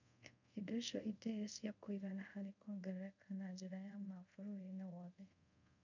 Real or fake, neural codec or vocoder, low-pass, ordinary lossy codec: fake; codec, 24 kHz, 0.5 kbps, DualCodec; 7.2 kHz; none